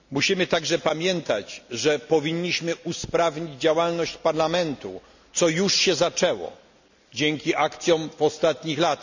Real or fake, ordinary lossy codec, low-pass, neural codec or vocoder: real; none; 7.2 kHz; none